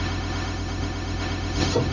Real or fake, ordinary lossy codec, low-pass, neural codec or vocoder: fake; none; 7.2 kHz; codec, 16 kHz, 0.4 kbps, LongCat-Audio-Codec